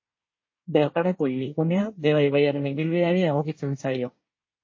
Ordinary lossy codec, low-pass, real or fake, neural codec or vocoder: MP3, 32 kbps; 7.2 kHz; fake; codec, 24 kHz, 1 kbps, SNAC